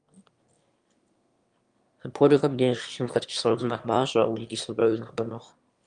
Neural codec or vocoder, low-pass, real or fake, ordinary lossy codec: autoencoder, 22.05 kHz, a latent of 192 numbers a frame, VITS, trained on one speaker; 9.9 kHz; fake; Opus, 32 kbps